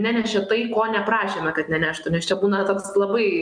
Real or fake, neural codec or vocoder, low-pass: real; none; 9.9 kHz